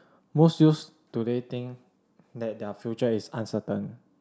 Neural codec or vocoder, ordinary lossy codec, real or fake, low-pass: none; none; real; none